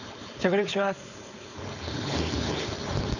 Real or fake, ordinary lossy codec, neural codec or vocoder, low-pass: fake; none; codec, 16 kHz, 4.8 kbps, FACodec; 7.2 kHz